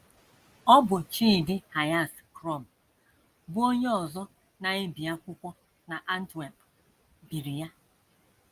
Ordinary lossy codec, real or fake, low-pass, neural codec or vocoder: Opus, 32 kbps; real; 14.4 kHz; none